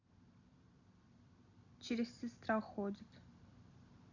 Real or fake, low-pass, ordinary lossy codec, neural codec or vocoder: real; 7.2 kHz; none; none